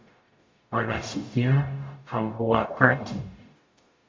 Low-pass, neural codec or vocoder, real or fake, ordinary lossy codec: 7.2 kHz; codec, 44.1 kHz, 0.9 kbps, DAC; fake; MP3, 64 kbps